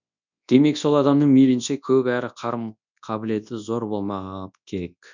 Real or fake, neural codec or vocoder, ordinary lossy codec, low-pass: fake; codec, 24 kHz, 0.9 kbps, WavTokenizer, large speech release; none; 7.2 kHz